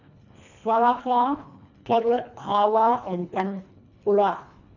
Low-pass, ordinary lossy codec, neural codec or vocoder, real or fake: 7.2 kHz; none; codec, 24 kHz, 1.5 kbps, HILCodec; fake